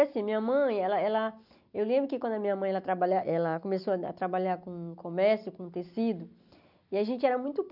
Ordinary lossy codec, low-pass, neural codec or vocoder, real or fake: none; 5.4 kHz; none; real